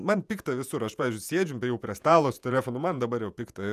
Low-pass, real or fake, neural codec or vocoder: 14.4 kHz; fake; vocoder, 48 kHz, 128 mel bands, Vocos